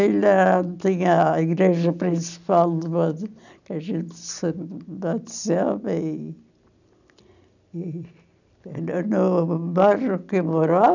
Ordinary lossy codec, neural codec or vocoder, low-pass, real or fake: none; none; 7.2 kHz; real